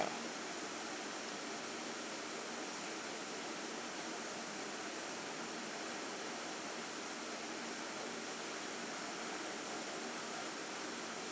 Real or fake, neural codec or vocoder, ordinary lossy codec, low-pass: real; none; none; none